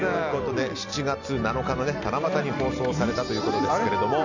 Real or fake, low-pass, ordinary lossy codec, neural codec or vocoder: real; 7.2 kHz; none; none